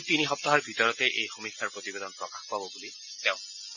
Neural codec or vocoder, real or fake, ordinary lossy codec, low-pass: none; real; none; 7.2 kHz